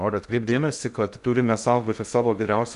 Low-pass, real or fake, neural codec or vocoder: 10.8 kHz; fake; codec, 16 kHz in and 24 kHz out, 0.6 kbps, FocalCodec, streaming, 2048 codes